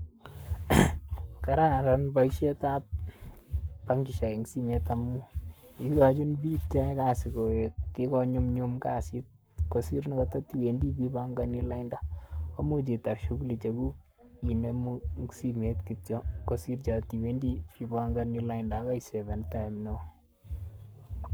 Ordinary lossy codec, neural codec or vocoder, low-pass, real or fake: none; codec, 44.1 kHz, 7.8 kbps, Pupu-Codec; none; fake